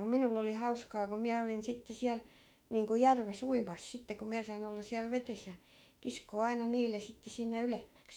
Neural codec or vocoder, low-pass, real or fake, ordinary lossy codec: autoencoder, 48 kHz, 32 numbers a frame, DAC-VAE, trained on Japanese speech; 19.8 kHz; fake; none